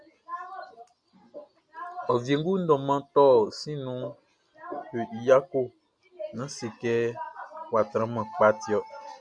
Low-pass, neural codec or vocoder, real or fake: 9.9 kHz; none; real